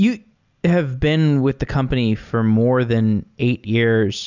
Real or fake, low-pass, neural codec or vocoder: real; 7.2 kHz; none